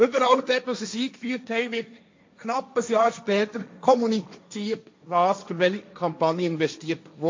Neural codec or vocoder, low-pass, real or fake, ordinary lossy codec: codec, 16 kHz, 1.1 kbps, Voila-Tokenizer; 7.2 kHz; fake; MP3, 48 kbps